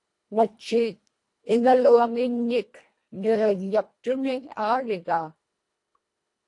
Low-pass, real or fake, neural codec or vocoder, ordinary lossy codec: 10.8 kHz; fake; codec, 24 kHz, 1.5 kbps, HILCodec; AAC, 48 kbps